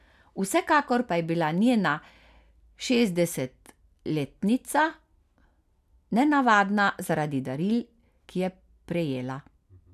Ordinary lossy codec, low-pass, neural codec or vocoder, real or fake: none; 14.4 kHz; none; real